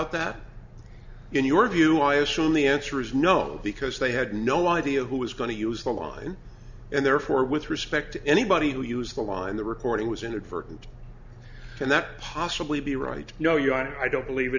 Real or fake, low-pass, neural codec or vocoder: real; 7.2 kHz; none